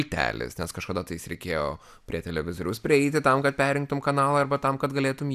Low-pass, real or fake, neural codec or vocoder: 14.4 kHz; real; none